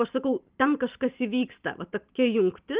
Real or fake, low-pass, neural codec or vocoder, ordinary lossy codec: real; 3.6 kHz; none; Opus, 32 kbps